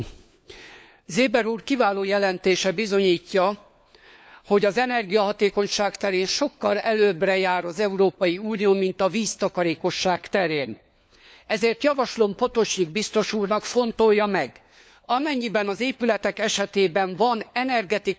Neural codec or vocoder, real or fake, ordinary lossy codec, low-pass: codec, 16 kHz, 4 kbps, FunCodec, trained on LibriTTS, 50 frames a second; fake; none; none